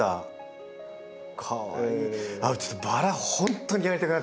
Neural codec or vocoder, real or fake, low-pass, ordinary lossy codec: none; real; none; none